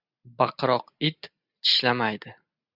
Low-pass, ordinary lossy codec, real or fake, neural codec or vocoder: 5.4 kHz; Opus, 64 kbps; real; none